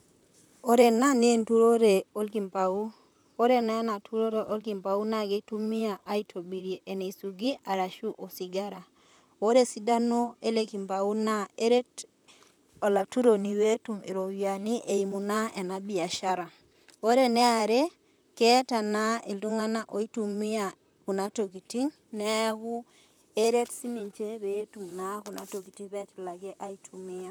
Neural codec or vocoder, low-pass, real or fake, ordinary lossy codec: vocoder, 44.1 kHz, 128 mel bands, Pupu-Vocoder; none; fake; none